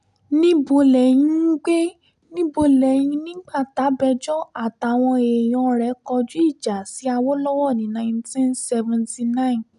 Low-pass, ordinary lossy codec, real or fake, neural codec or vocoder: 10.8 kHz; none; real; none